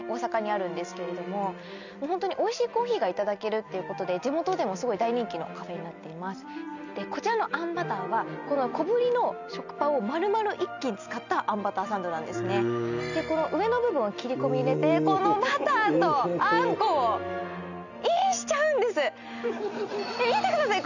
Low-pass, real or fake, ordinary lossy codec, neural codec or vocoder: 7.2 kHz; real; none; none